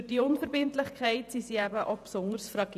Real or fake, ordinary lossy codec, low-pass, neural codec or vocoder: fake; none; 14.4 kHz; vocoder, 48 kHz, 128 mel bands, Vocos